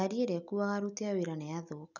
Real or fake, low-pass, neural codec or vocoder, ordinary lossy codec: real; 7.2 kHz; none; none